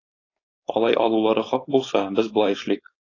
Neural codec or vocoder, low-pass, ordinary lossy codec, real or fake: codec, 16 kHz, 4.8 kbps, FACodec; 7.2 kHz; AAC, 32 kbps; fake